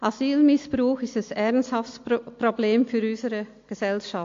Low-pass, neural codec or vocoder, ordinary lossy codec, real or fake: 7.2 kHz; none; AAC, 48 kbps; real